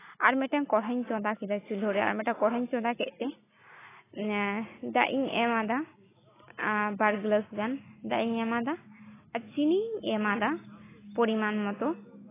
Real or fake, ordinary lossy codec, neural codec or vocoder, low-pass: real; AAC, 16 kbps; none; 3.6 kHz